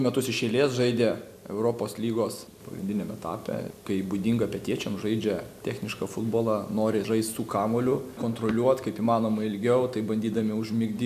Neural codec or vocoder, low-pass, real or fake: none; 14.4 kHz; real